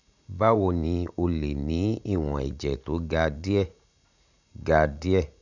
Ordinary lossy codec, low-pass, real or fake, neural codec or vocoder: none; 7.2 kHz; fake; vocoder, 44.1 kHz, 80 mel bands, Vocos